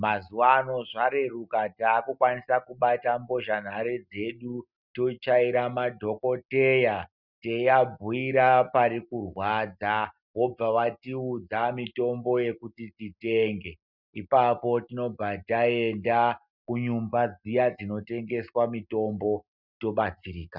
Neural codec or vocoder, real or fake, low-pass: none; real; 5.4 kHz